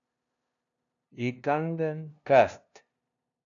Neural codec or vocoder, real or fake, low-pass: codec, 16 kHz, 0.5 kbps, FunCodec, trained on LibriTTS, 25 frames a second; fake; 7.2 kHz